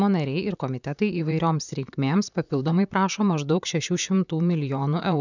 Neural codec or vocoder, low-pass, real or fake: vocoder, 44.1 kHz, 128 mel bands, Pupu-Vocoder; 7.2 kHz; fake